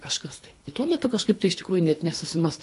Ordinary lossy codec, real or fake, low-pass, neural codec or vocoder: AAC, 48 kbps; fake; 10.8 kHz; codec, 24 kHz, 3 kbps, HILCodec